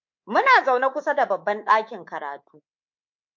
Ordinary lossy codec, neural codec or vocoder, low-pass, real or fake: MP3, 48 kbps; codec, 24 kHz, 3.1 kbps, DualCodec; 7.2 kHz; fake